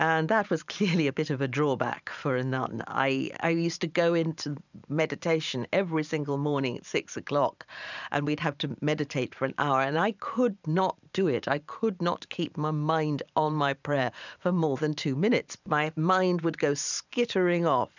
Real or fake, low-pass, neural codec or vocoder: fake; 7.2 kHz; vocoder, 44.1 kHz, 128 mel bands every 512 samples, BigVGAN v2